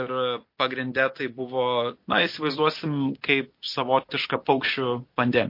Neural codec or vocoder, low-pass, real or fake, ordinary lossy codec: none; 5.4 kHz; real; MP3, 32 kbps